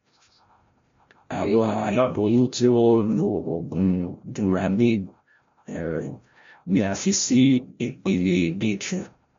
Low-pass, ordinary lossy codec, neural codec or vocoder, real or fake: 7.2 kHz; MP3, 48 kbps; codec, 16 kHz, 0.5 kbps, FreqCodec, larger model; fake